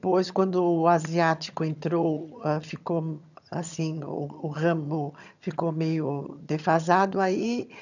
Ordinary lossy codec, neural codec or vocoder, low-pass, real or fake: none; vocoder, 22.05 kHz, 80 mel bands, HiFi-GAN; 7.2 kHz; fake